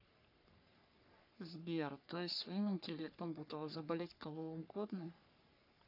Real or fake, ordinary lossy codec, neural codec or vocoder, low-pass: fake; none; codec, 44.1 kHz, 3.4 kbps, Pupu-Codec; 5.4 kHz